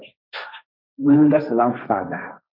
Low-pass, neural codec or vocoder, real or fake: 5.4 kHz; codec, 16 kHz, 1.1 kbps, Voila-Tokenizer; fake